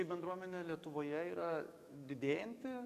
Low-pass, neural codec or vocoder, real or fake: 14.4 kHz; codec, 44.1 kHz, 7.8 kbps, DAC; fake